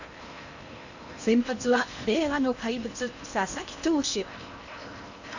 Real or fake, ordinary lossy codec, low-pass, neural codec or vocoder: fake; none; 7.2 kHz; codec, 16 kHz in and 24 kHz out, 0.8 kbps, FocalCodec, streaming, 65536 codes